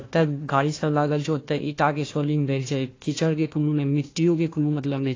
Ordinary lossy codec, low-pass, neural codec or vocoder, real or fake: AAC, 32 kbps; 7.2 kHz; codec, 16 kHz, 1 kbps, FunCodec, trained on Chinese and English, 50 frames a second; fake